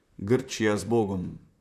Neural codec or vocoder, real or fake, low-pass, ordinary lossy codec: vocoder, 44.1 kHz, 128 mel bands, Pupu-Vocoder; fake; 14.4 kHz; none